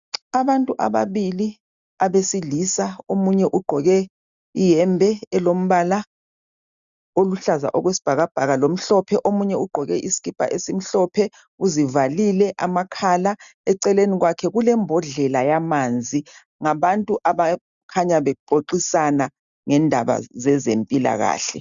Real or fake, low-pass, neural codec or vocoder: real; 7.2 kHz; none